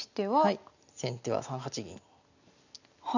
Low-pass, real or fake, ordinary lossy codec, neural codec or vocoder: 7.2 kHz; real; none; none